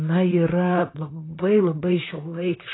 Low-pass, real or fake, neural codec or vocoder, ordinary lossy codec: 7.2 kHz; fake; vocoder, 44.1 kHz, 128 mel bands, Pupu-Vocoder; AAC, 16 kbps